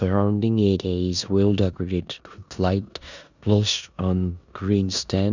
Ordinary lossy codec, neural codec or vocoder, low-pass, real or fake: none; codec, 16 kHz in and 24 kHz out, 0.9 kbps, LongCat-Audio-Codec, four codebook decoder; 7.2 kHz; fake